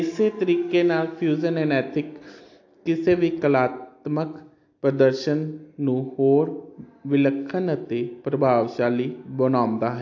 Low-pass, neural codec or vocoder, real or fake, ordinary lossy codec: 7.2 kHz; none; real; AAC, 48 kbps